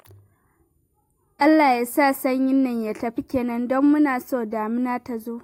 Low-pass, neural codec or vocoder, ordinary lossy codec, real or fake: 19.8 kHz; none; AAC, 48 kbps; real